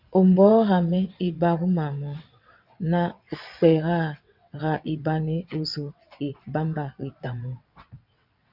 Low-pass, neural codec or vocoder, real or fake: 5.4 kHz; vocoder, 22.05 kHz, 80 mel bands, WaveNeXt; fake